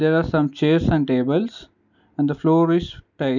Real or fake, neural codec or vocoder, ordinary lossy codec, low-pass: real; none; none; 7.2 kHz